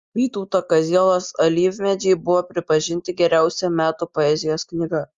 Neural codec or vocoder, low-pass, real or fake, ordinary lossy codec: none; 7.2 kHz; real; Opus, 32 kbps